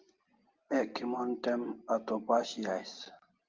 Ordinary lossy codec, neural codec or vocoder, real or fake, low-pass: Opus, 32 kbps; none; real; 7.2 kHz